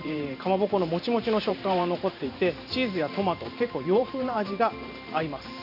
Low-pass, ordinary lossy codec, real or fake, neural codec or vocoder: 5.4 kHz; none; fake; vocoder, 44.1 kHz, 128 mel bands every 512 samples, BigVGAN v2